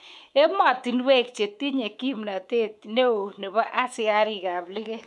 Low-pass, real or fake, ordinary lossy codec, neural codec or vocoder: none; fake; none; codec, 24 kHz, 3.1 kbps, DualCodec